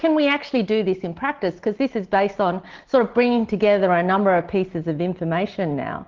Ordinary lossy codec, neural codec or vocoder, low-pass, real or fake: Opus, 16 kbps; none; 7.2 kHz; real